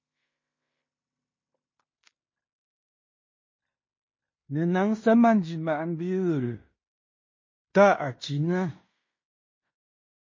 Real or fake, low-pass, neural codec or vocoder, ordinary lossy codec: fake; 7.2 kHz; codec, 16 kHz in and 24 kHz out, 0.9 kbps, LongCat-Audio-Codec, fine tuned four codebook decoder; MP3, 32 kbps